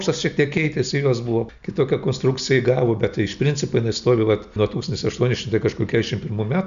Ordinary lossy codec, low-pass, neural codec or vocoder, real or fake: AAC, 96 kbps; 7.2 kHz; none; real